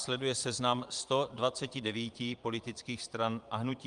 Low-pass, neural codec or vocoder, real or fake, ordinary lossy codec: 9.9 kHz; vocoder, 22.05 kHz, 80 mel bands, Vocos; fake; Opus, 32 kbps